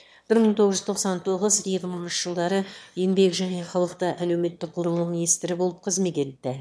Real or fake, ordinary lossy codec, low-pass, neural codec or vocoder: fake; none; none; autoencoder, 22.05 kHz, a latent of 192 numbers a frame, VITS, trained on one speaker